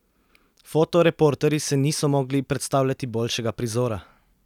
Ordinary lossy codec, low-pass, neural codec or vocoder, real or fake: none; 19.8 kHz; none; real